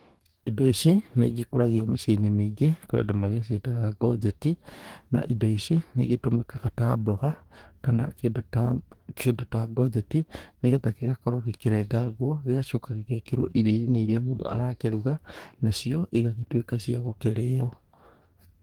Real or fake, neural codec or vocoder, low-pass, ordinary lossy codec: fake; codec, 44.1 kHz, 2.6 kbps, DAC; 19.8 kHz; Opus, 24 kbps